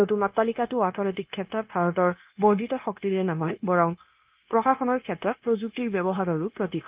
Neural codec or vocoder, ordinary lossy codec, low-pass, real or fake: codec, 16 kHz, 0.9 kbps, LongCat-Audio-Codec; Opus, 24 kbps; 3.6 kHz; fake